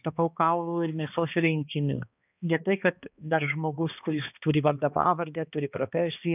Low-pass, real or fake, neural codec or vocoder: 3.6 kHz; fake; codec, 16 kHz, 2 kbps, X-Codec, HuBERT features, trained on balanced general audio